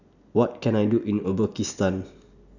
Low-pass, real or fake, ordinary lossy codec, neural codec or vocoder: 7.2 kHz; real; none; none